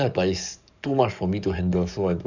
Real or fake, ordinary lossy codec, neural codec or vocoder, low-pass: fake; none; codec, 44.1 kHz, 7.8 kbps, DAC; 7.2 kHz